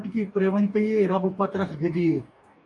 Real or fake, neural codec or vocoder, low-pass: fake; codec, 44.1 kHz, 2.6 kbps, DAC; 10.8 kHz